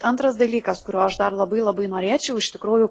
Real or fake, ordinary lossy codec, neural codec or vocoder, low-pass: real; AAC, 32 kbps; none; 10.8 kHz